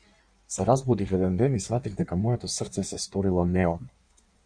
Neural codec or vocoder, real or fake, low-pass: codec, 16 kHz in and 24 kHz out, 1.1 kbps, FireRedTTS-2 codec; fake; 9.9 kHz